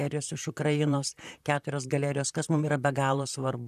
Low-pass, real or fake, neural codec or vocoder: 14.4 kHz; fake; vocoder, 44.1 kHz, 128 mel bands, Pupu-Vocoder